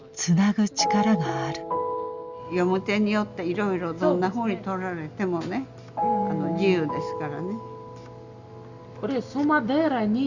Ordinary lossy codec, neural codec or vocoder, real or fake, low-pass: Opus, 64 kbps; none; real; 7.2 kHz